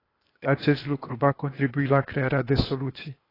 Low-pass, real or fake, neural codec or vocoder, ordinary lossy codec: 5.4 kHz; fake; codec, 16 kHz, 0.8 kbps, ZipCodec; AAC, 24 kbps